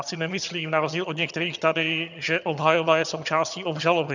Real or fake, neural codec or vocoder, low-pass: fake; vocoder, 22.05 kHz, 80 mel bands, HiFi-GAN; 7.2 kHz